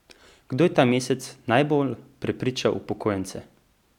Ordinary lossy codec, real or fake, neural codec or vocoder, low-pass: none; real; none; 19.8 kHz